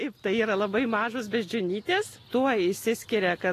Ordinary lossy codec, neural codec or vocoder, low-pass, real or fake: AAC, 48 kbps; none; 14.4 kHz; real